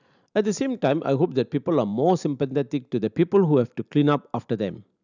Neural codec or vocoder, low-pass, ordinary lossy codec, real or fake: none; 7.2 kHz; none; real